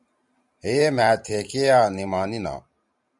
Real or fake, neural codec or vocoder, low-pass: fake; vocoder, 44.1 kHz, 128 mel bands every 512 samples, BigVGAN v2; 10.8 kHz